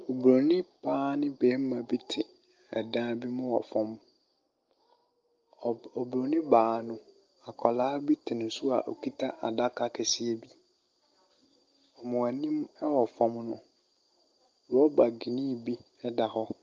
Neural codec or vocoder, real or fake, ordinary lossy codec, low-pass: none; real; Opus, 32 kbps; 7.2 kHz